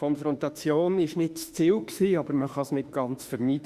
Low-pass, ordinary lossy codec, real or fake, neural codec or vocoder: 14.4 kHz; Opus, 64 kbps; fake; autoencoder, 48 kHz, 32 numbers a frame, DAC-VAE, trained on Japanese speech